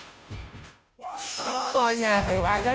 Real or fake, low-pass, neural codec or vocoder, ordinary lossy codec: fake; none; codec, 16 kHz, 0.5 kbps, FunCodec, trained on Chinese and English, 25 frames a second; none